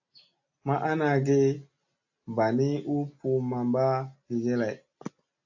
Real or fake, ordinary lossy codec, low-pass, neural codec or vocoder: real; AAC, 48 kbps; 7.2 kHz; none